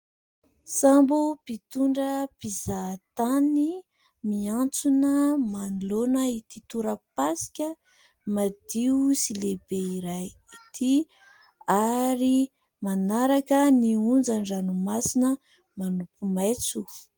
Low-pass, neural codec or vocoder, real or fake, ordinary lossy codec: 19.8 kHz; none; real; Opus, 24 kbps